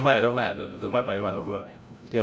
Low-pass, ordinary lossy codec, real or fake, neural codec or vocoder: none; none; fake; codec, 16 kHz, 0.5 kbps, FreqCodec, larger model